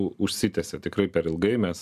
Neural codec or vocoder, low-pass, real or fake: none; 14.4 kHz; real